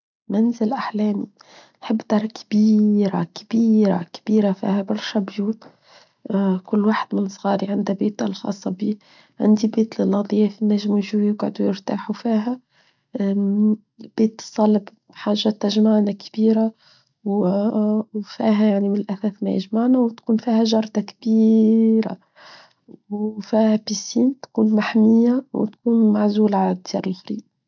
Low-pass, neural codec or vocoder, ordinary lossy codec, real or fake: 7.2 kHz; none; none; real